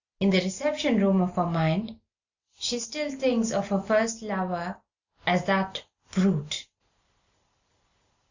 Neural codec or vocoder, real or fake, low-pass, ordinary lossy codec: none; real; 7.2 kHz; Opus, 64 kbps